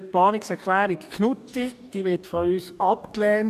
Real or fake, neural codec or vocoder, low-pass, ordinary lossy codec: fake; codec, 44.1 kHz, 2.6 kbps, DAC; 14.4 kHz; none